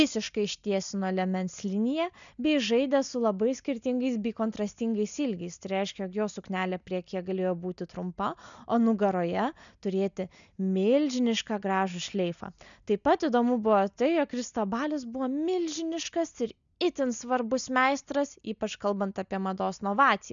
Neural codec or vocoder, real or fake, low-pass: none; real; 7.2 kHz